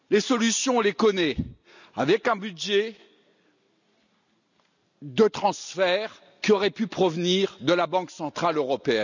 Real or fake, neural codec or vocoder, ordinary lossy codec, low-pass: real; none; none; 7.2 kHz